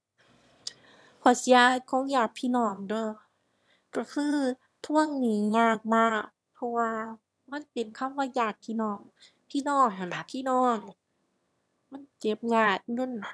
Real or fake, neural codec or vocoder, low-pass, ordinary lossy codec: fake; autoencoder, 22.05 kHz, a latent of 192 numbers a frame, VITS, trained on one speaker; none; none